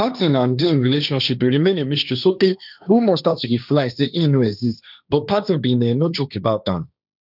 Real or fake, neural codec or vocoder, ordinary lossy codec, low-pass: fake; codec, 16 kHz, 1.1 kbps, Voila-Tokenizer; none; 5.4 kHz